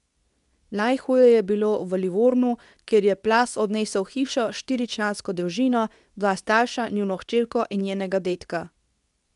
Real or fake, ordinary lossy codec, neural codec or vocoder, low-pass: fake; none; codec, 24 kHz, 0.9 kbps, WavTokenizer, medium speech release version 2; 10.8 kHz